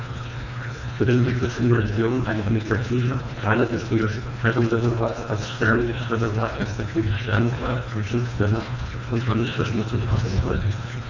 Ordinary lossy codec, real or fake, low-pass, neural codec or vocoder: none; fake; 7.2 kHz; codec, 24 kHz, 1.5 kbps, HILCodec